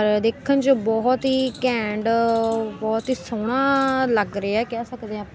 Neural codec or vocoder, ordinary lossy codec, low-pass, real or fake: none; none; none; real